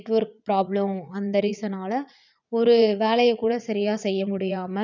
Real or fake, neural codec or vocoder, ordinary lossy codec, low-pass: fake; vocoder, 44.1 kHz, 80 mel bands, Vocos; none; 7.2 kHz